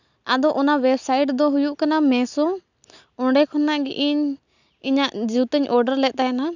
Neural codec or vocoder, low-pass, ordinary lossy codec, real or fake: none; 7.2 kHz; none; real